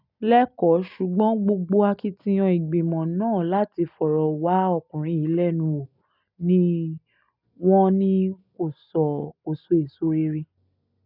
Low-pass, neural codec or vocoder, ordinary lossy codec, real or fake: 5.4 kHz; none; none; real